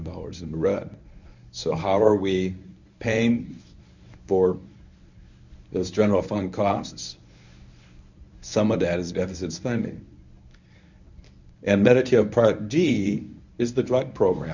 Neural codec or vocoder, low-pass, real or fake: codec, 24 kHz, 0.9 kbps, WavTokenizer, medium speech release version 1; 7.2 kHz; fake